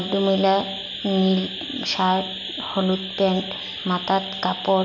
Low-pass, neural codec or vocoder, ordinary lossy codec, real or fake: 7.2 kHz; none; none; real